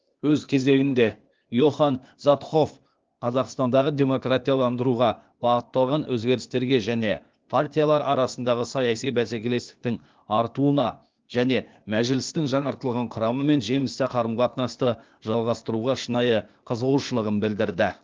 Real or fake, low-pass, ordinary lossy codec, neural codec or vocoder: fake; 7.2 kHz; Opus, 24 kbps; codec, 16 kHz, 0.8 kbps, ZipCodec